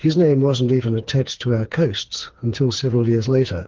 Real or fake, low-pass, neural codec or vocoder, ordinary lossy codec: fake; 7.2 kHz; codec, 16 kHz, 4 kbps, FreqCodec, smaller model; Opus, 16 kbps